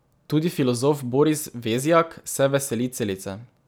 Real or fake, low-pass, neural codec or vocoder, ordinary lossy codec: real; none; none; none